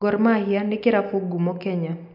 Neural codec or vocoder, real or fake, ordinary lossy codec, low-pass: none; real; none; 5.4 kHz